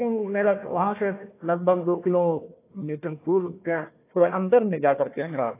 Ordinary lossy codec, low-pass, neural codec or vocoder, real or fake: AAC, 24 kbps; 3.6 kHz; codec, 16 kHz, 1 kbps, FunCodec, trained on Chinese and English, 50 frames a second; fake